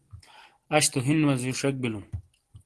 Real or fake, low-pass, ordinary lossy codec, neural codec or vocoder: real; 10.8 kHz; Opus, 16 kbps; none